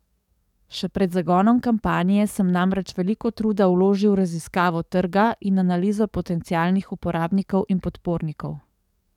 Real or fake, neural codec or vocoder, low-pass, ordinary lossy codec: fake; codec, 44.1 kHz, 7.8 kbps, DAC; 19.8 kHz; none